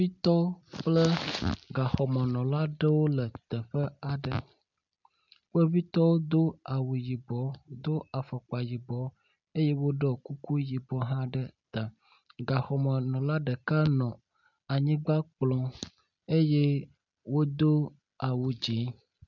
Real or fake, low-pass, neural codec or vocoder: real; 7.2 kHz; none